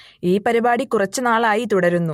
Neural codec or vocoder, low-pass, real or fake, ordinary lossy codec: none; 19.8 kHz; real; MP3, 64 kbps